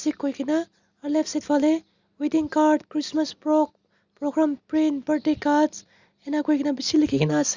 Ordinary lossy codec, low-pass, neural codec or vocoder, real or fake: Opus, 64 kbps; 7.2 kHz; none; real